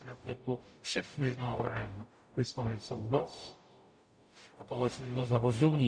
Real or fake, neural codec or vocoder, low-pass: fake; codec, 44.1 kHz, 0.9 kbps, DAC; 9.9 kHz